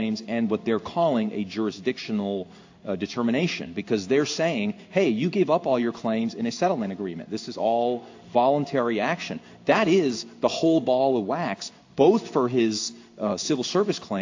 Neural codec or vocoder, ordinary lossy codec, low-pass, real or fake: codec, 16 kHz in and 24 kHz out, 1 kbps, XY-Tokenizer; AAC, 48 kbps; 7.2 kHz; fake